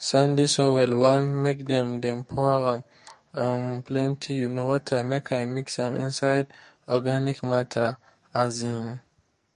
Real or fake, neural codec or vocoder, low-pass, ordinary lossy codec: fake; codec, 44.1 kHz, 2.6 kbps, SNAC; 14.4 kHz; MP3, 48 kbps